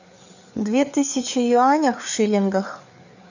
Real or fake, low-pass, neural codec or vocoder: fake; 7.2 kHz; codec, 16 kHz, 4 kbps, FreqCodec, larger model